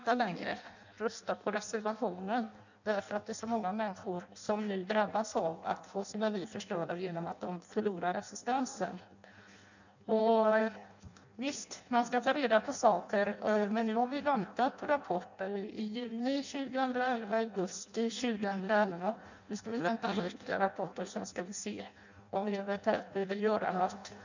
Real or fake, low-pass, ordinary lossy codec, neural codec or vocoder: fake; 7.2 kHz; none; codec, 16 kHz in and 24 kHz out, 0.6 kbps, FireRedTTS-2 codec